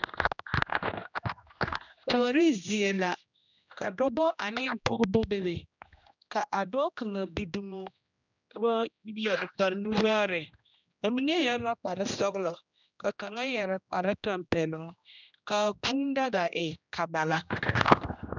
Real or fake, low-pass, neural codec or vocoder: fake; 7.2 kHz; codec, 16 kHz, 1 kbps, X-Codec, HuBERT features, trained on general audio